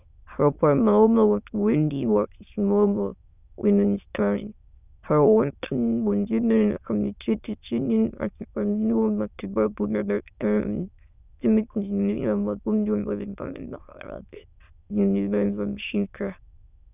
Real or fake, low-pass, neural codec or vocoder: fake; 3.6 kHz; autoencoder, 22.05 kHz, a latent of 192 numbers a frame, VITS, trained on many speakers